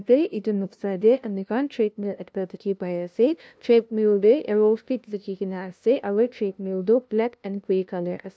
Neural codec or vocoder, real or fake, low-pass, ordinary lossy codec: codec, 16 kHz, 0.5 kbps, FunCodec, trained on LibriTTS, 25 frames a second; fake; none; none